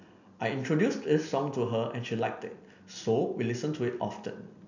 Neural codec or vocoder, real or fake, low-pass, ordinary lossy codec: none; real; 7.2 kHz; none